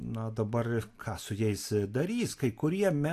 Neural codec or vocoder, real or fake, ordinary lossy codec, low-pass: none; real; AAC, 64 kbps; 14.4 kHz